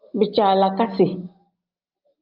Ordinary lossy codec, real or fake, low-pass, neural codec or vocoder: Opus, 32 kbps; real; 5.4 kHz; none